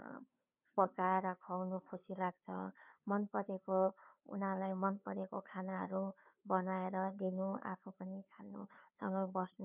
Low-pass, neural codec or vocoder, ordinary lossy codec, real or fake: 3.6 kHz; codec, 16 kHz, 2 kbps, FunCodec, trained on Chinese and English, 25 frames a second; none; fake